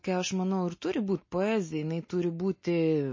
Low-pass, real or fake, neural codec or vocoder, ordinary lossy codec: 7.2 kHz; real; none; MP3, 32 kbps